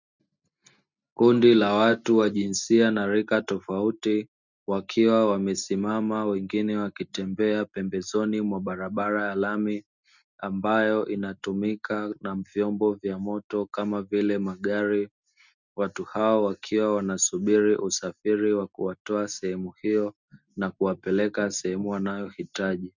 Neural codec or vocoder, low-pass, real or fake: none; 7.2 kHz; real